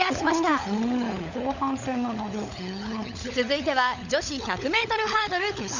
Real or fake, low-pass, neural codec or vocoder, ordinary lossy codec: fake; 7.2 kHz; codec, 16 kHz, 16 kbps, FunCodec, trained on LibriTTS, 50 frames a second; none